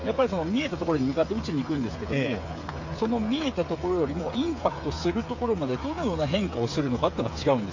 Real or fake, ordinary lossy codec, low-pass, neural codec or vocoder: fake; AAC, 48 kbps; 7.2 kHz; codec, 16 kHz, 8 kbps, FreqCodec, smaller model